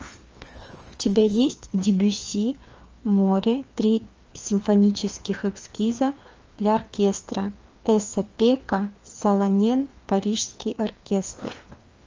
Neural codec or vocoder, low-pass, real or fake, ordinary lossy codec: codec, 16 kHz, 2 kbps, FreqCodec, larger model; 7.2 kHz; fake; Opus, 24 kbps